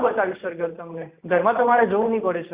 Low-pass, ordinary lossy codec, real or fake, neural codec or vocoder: 3.6 kHz; Opus, 64 kbps; real; none